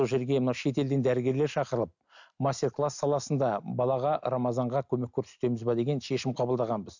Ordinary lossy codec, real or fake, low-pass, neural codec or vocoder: none; real; 7.2 kHz; none